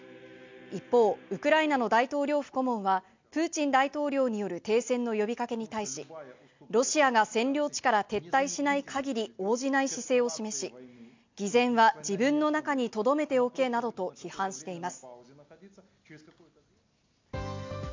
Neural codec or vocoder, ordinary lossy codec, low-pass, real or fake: none; MP3, 64 kbps; 7.2 kHz; real